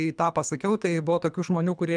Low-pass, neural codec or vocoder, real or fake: 9.9 kHz; codec, 24 kHz, 3 kbps, HILCodec; fake